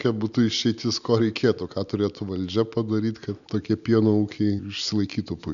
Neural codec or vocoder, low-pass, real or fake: none; 7.2 kHz; real